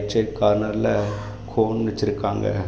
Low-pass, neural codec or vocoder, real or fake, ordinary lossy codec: none; none; real; none